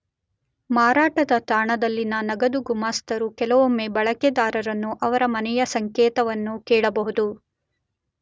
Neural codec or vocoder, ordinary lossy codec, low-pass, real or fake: none; none; none; real